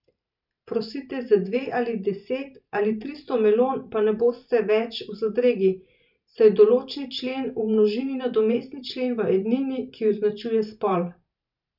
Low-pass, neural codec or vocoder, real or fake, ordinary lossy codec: 5.4 kHz; vocoder, 44.1 kHz, 128 mel bands every 512 samples, BigVGAN v2; fake; none